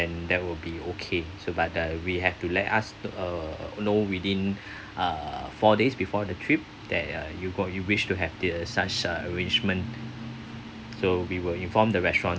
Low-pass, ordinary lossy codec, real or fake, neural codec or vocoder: none; none; real; none